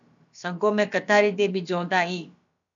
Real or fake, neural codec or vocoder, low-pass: fake; codec, 16 kHz, about 1 kbps, DyCAST, with the encoder's durations; 7.2 kHz